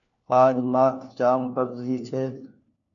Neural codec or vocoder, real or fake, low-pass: codec, 16 kHz, 1 kbps, FunCodec, trained on LibriTTS, 50 frames a second; fake; 7.2 kHz